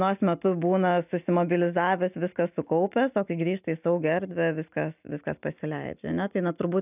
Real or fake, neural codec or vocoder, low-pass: real; none; 3.6 kHz